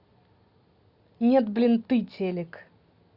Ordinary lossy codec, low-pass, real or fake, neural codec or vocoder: Opus, 64 kbps; 5.4 kHz; real; none